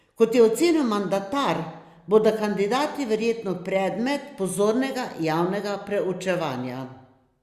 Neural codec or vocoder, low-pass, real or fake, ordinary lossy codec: none; 14.4 kHz; real; Opus, 64 kbps